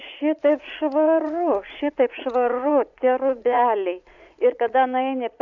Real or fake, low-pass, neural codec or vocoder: fake; 7.2 kHz; vocoder, 44.1 kHz, 80 mel bands, Vocos